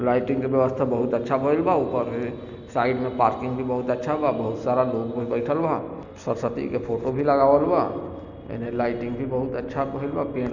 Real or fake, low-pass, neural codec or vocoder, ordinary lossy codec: real; 7.2 kHz; none; none